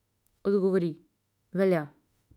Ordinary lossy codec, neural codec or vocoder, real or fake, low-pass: none; autoencoder, 48 kHz, 32 numbers a frame, DAC-VAE, trained on Japanese speech; fake; 19.8 kHz